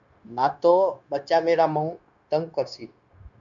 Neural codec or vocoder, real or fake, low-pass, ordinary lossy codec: codec, 16 kHz, 0.9 kbps, LongCat-Audio-Codec; fake; 7.2 kHz; MP3, 96 kbps